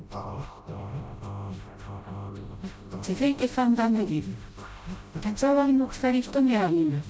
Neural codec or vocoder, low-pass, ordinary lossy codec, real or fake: codec, 16 kHz, 0.5 kbps, FreqCodec, smaller model; none; none; fake